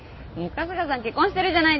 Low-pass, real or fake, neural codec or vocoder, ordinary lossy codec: 7.2 kHz; real; none; MP3, 24 kbps